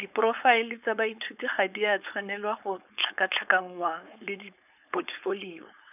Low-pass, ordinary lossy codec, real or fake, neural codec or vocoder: 3.6 kHz; none; fake; codec, 16 kHz, 4.8 kbps, FACodec